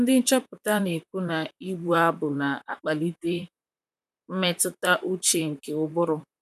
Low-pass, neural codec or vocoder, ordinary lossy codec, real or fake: 14.4 kHz; vocoder, 44.1 kHz, 128 mel bands, Pupu-Vocoder; none; fake